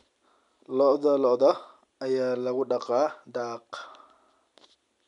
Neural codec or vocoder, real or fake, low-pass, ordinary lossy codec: none; real; 10.8 kHz; none